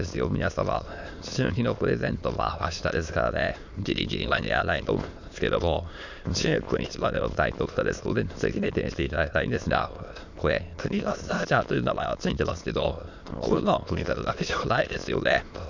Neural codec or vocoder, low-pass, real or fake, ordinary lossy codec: autoencoder, 22.05 kHz, a latent of 192 numbers a frame, VITS, trained on many speakers; 7.2 kHz; fake; none